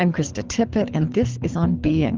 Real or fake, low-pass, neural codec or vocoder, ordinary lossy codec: fake; 7.2 kHz; codec, 24 kHz, 6 kbps, HILCodec; Opus, 32 kbps